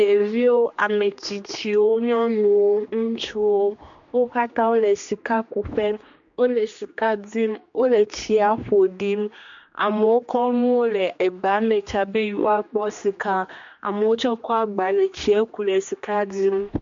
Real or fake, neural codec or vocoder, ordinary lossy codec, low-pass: fake; codec, 16 kHz, 2 kbps, X-Codec, HuBERT features, trained on general audio; MP3, 48 kbps; 7.2 kHz